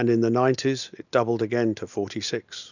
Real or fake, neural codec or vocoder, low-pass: real; none; 7.2 kHz